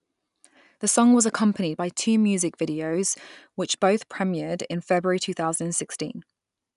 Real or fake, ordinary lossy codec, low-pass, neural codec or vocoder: real; none; 10.8 kHz; none